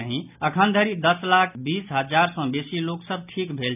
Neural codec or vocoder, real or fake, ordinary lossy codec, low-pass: none; real; none; 3.6 kHz